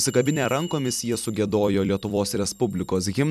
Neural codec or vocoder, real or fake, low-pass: vocoder, 44.1 kHz, 128 mel bands every 256 samples, BigVGAN v2; fake; 14.4 kHz